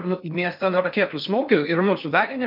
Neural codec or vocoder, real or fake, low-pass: codec, 16 kHz in and 24 kHz out, 0.6 kbps, FocalCodec, streaming, 2048 codes; fake; 5.4 kHz